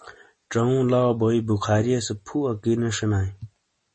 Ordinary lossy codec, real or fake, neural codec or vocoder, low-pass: MP3, 32 kbps; real; none; 10.8 kHz